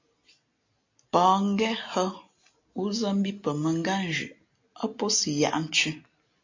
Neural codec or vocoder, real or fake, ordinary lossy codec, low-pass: none; real; AAC, 48 kbps; 7.2 kHz